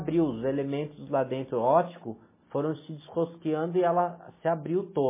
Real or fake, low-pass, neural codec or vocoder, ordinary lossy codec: real; 3.6 kHz; none; MP3, 16 kbps